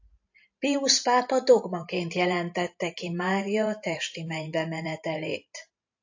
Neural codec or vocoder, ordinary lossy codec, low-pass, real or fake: vocoder, 44.1 kHz, 128 mel bands every 512 samples, BigVGAN v2; AAC, 48 kbps; 7.2 kHz; fake